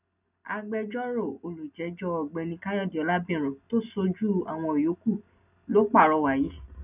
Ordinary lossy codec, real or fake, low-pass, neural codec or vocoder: none; real; 3.6 kHz; none